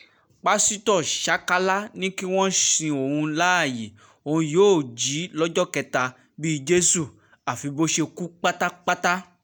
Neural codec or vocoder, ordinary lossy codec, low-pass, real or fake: none; none; none; real